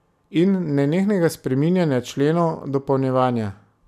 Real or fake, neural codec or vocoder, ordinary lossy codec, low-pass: real; none; none; 14.4 kHz